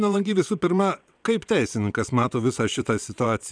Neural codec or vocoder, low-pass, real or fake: vocoder, 22.05 kHz, 80 mel bands, WaveNeXt; 9.9 kHz; fake